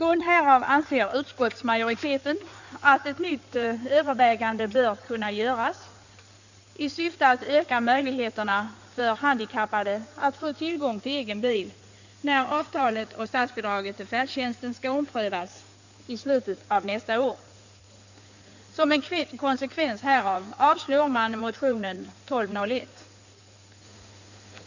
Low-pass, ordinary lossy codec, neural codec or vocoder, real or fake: 7.2 kHz; none; codec, 16 kHz in and 24 kHz out, 2.2 kbps, FireRedTTS-2 codec; fake